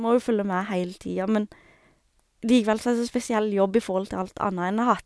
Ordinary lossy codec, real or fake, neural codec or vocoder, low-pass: none; real; none; none